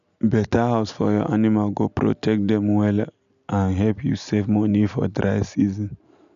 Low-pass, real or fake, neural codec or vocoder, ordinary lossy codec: 7.2 kHz; real; none; none